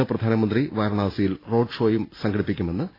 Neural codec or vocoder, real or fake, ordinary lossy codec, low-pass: none; real; AAC, 24 kbps; 5.4 kHz